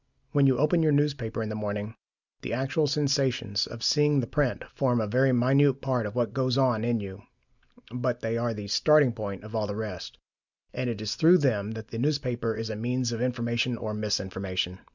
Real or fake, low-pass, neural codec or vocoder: real; 7.2 kHz; none